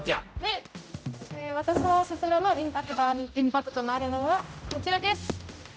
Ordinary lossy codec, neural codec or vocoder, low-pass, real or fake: none; codec, 16 kHz, 0.5 kbps, X-Codec, HuBERT features, trained on general audio; none; fake